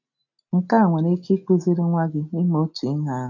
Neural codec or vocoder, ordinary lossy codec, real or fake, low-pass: none; none; real; 7.2 kHz